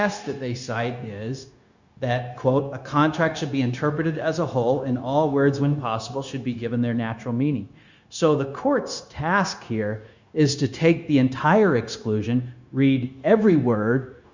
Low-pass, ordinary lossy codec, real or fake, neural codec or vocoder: 7.2 kHz; Opus, 64 kbps; fake; codec, 16 kHz, 0.9 kbps, LongCat-Audio-Codec